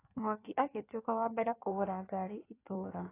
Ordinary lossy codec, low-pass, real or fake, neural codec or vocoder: AAC, 16 kbps; 3.6 kHz; fake; codec, 16 kHz in and 24 kHz out, 1.1 kbps, FireRedTTS-2 codec